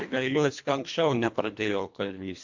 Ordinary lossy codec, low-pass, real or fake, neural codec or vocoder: MP3, 48 kbps; 7.2 kHz; fake; codec, 24 kHz, 1.5 kbps, HILCodec